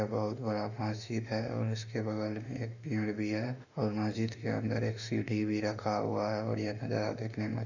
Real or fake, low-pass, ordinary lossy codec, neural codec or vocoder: fake; 7.2 kHz; none; autoencoder, 48 kHz, 32 numbers a frame, DAC-VAE, trained on Japanese speech